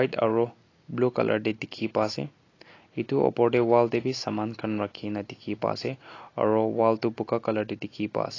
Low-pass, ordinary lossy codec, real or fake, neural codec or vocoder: 7.2 kHz; AAC, 32 kbps; real; none